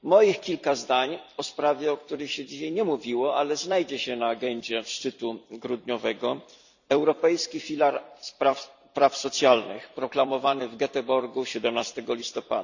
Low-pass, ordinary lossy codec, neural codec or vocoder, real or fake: 7.2 kHz; none; vocoder, 44.1 kHz, 128 mel bands every 256 samples, BigVGAN v2; fake